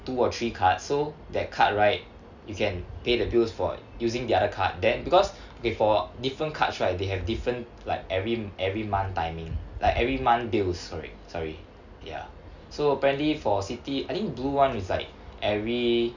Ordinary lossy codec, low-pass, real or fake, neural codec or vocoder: none; 7.2 kHz; real; none